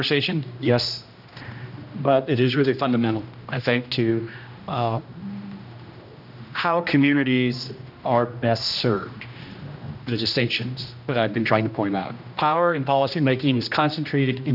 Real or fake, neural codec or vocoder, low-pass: fake; codec, 16 kHz, 1 kbps, X-Codec, HuBERT features, trained on general audio; 5.4 kHz